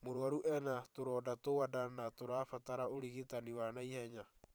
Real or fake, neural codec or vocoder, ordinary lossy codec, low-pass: fake; vocoder, 44.1 kHz, 128 mel bands every 512 samples, BigVGAN v2; none; none